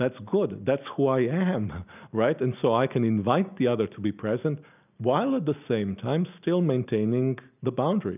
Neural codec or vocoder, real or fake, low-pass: none; real; 3.6 kHz